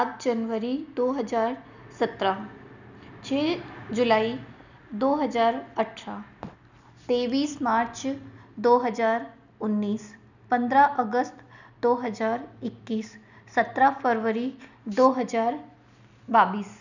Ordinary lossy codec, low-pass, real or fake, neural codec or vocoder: none; 7.2 kHz; real; none